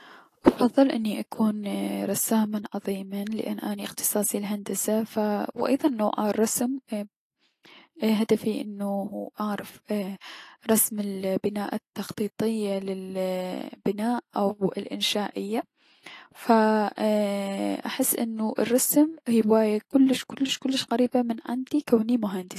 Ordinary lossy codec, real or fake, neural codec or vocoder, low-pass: AAC, 48 kbps; real; none; 14.4 kHz